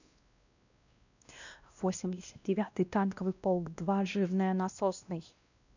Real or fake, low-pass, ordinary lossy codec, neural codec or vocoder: fake; 7.2 kHz; none; codec, 16 kHz, 1 kbps, X-Codec, WavLM features, trained on Multilingual LibriSpeech